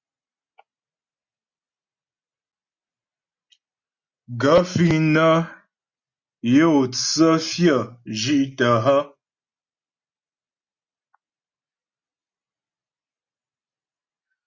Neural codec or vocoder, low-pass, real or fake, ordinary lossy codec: none; 7.2 kHz; real; Opus, 64 kbps